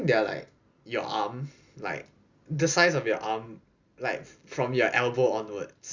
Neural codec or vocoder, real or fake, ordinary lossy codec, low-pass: none; real; Opus, 64 kbps; 7.2 kHz